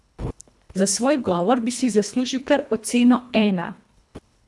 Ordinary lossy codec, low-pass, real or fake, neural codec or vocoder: none; none; fake; codec, 24 kHz, 1.5 kbps, HILCodec